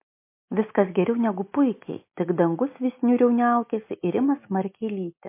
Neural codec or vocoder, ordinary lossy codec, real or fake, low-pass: none; MP3, 24 kbps; real; 3.6 kHz